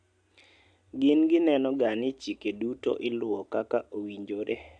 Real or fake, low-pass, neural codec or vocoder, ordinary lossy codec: real; 9.9 kHz; none; AAC, 64 kbps